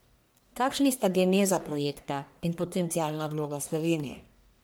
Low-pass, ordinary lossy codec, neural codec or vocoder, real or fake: none; none; codec, 44.1 kHz, 1.7 kbps, Pupu-Codec; fake